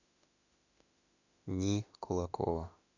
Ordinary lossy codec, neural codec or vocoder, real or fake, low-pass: none; autoencoder, 48 kHz, 32 numbers a frame, DAC-VAE, trained on Japanese speech; fake; 7.2 kHz